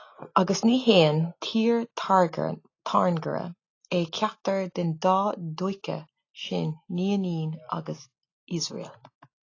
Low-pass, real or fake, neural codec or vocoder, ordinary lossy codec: 7.2 kHz; real; none; AAC, 32 kbps